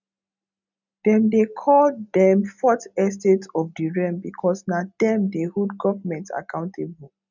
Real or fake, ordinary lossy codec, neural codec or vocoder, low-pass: real; none; none; 7.2 kHz